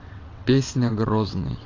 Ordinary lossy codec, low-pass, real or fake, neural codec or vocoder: AAC, 32 kbps; 7.2 kHz; fake; vocoder, 22.05 kHz, 80 mel bands, WaveNeXt